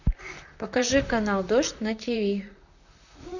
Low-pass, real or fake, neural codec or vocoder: 7.2 kHz; fake; vocoder, 44.1 kHz, 128 mel bands, Pupu-Vocoder